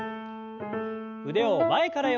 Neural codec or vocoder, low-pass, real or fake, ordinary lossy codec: none; 7.2 kHz; real; none